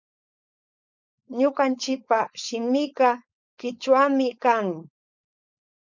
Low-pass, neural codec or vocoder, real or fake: 7.2 kHz; codec, 16 kHz, 4.8 kbps, FACodec; fake